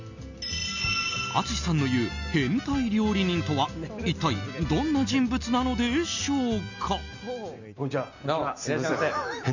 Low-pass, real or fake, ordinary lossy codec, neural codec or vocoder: 7.2 kHz; real; none; none